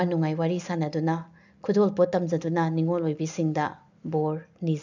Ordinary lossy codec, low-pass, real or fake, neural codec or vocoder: none; 7.2 kHz; real; none